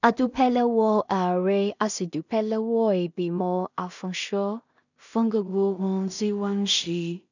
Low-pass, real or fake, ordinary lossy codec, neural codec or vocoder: 7.2 kHz; fake; none; codec, 16 kHz in and 24 kHz out, 0.4 kbps, LongCat-Audio-Codec, two codebook decoder